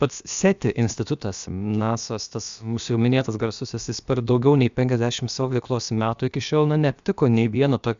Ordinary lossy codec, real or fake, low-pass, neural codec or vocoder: Opus, 64 kbps; fake; 7.2 kHz; codec, 16 kHz, about 1 kbps, DyCAST, with the encoder's durations